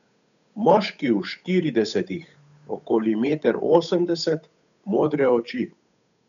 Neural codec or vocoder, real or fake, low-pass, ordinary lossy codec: codec, 16 kHz, 8 kbps, FunCodec, trained on Chinese and English, 25 frames a second; fake; 7.2 kHz; none